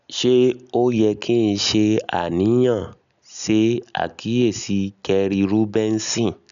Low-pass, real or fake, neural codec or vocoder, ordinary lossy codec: 7.2 kHz; real; none; none